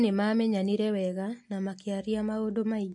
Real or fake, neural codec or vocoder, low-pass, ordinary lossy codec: real; none; 10.8 kHz; MP3, 48 kbps